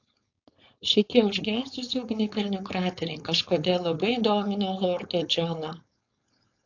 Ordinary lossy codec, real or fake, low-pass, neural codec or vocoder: AAC, 48 kbps; fake; 7.2 kHz; codec, 16 kHz, 4.8 kbps, FACodec